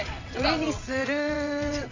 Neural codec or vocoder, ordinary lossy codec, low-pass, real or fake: vocoder, 22.05 kHz, 80 mel bands, WaveNeXt; Opus, 64 kbps; 7.2 kHz; fake